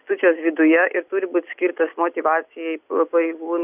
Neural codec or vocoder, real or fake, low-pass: none; real; 3.6 kHz